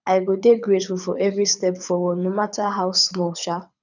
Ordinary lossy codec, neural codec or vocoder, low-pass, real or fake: none; codec, 24 kHz, 6 kbps, HILCodec; 7.2 kHz; fake